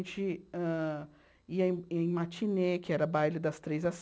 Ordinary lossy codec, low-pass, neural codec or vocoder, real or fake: none; none; none; real